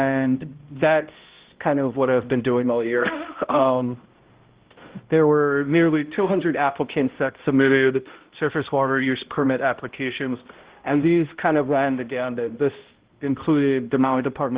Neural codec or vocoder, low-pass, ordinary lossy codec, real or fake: codec, 16 kHz, 0.5 kbps, X-Codec, HuBERT features, trained on balanced general audio; 3.6 kHz; Opus, 16 kbps; fake